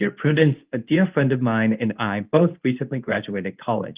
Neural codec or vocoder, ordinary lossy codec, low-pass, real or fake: codec, 24 kHz, 0.9 kbps, WavTokenizer, medium speech release version 2; Opus, 32 kbps; 3.6 kHz; fake